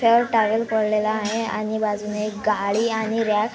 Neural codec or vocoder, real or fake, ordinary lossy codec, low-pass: none; real; none; none